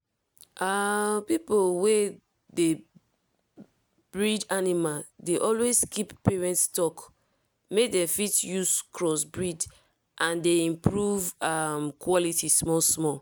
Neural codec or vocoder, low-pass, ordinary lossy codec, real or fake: none; none; none; real